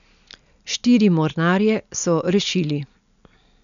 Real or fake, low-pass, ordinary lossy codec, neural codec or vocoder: real; 7.2 kHz; none; none